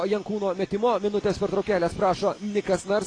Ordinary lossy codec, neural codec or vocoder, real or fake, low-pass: AAC, 32 kbps; none; real; 9.9 kHz